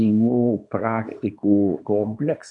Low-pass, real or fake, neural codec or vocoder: 10.8 kHz; fake; codec, 24 kHz, 0.9 kbps, WavTokenizer, small release